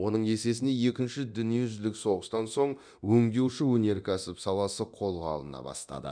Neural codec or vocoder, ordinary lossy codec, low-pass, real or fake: codec, 24 kHz, 0.9 kbps, DualCodec; none; 9.9 kHz; fake